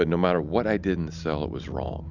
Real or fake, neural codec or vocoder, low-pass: real; none; 7.2 kHz